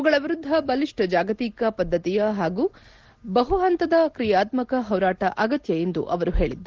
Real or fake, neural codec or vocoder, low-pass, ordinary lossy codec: real; none; 7.2 kHz; Opus, 16 kbps